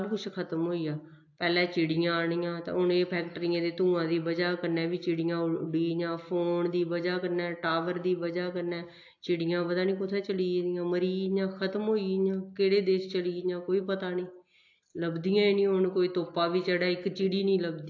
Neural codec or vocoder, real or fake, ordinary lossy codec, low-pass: none; real; AAC, 48 kbps; 7.2 kHz